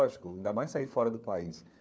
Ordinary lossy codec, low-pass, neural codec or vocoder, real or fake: none; none; codec, 16 kHz, 4 kbps, FunCodec, trained on LibriTTS, 50 frames a second; fake